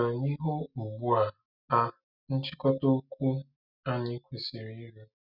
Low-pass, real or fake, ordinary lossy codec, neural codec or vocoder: 5.4 kHz; real; none; none